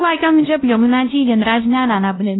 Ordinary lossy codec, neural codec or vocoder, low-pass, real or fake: AAC, 16 kbps; codec, 16 kHz, 0.5 kbps, X-Codec, WavLM features, trained on Multilingual LibriSpeech; 7.2 kHz; fake